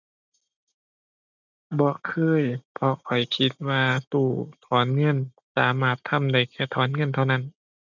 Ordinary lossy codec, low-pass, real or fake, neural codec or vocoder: none; 7.2 kHz; real; none